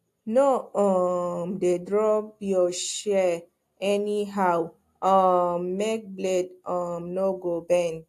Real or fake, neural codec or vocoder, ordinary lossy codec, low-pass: real; none; AAC, 64 kbps; 14.4 kHz